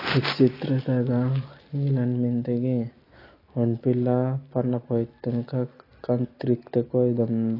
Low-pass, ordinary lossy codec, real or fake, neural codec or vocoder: 5.4 kHz; AAC, 24 kbps; real; none